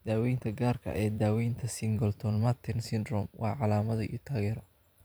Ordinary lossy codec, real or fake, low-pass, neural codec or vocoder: none; fake; none; vocoder, 44.1 kHz, 128 mel bands every 512 samples, BigVGAN v2